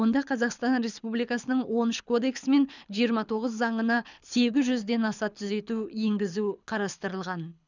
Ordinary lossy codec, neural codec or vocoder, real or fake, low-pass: none; codec, 24 kHz, 6 kbps, HILCodec; fake; 7.2 kHz